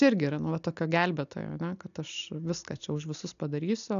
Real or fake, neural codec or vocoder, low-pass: real; none; 7.2 kHz